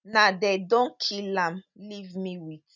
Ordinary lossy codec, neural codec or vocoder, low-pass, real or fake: none; none; 7.2 kHz; real